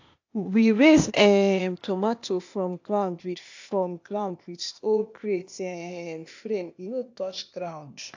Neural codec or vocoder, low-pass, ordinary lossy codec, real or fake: codec, 16 kHz, 0.8 kbps, ZipCodec; 7.2 kHz; AAC, 48 kbps; fake